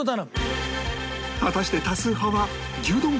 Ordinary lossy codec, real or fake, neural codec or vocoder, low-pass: none; real; none; none